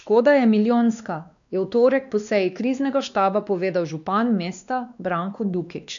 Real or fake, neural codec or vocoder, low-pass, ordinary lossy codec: fake; codec, 16 kHz, 2 kbps, X-Codec, WavLM features, trained on Multilingual LibriSpeech; 7.2 kHz; none